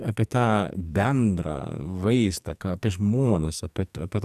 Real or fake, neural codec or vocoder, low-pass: fake; codec, 44.1 kHz, 2.6 kbps, SNAC; 14.4 kHz